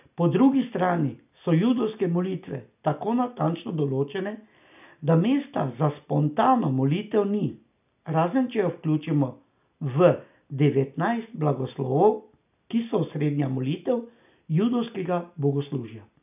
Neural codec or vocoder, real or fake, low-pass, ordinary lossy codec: none; real; 3.6 kHz; none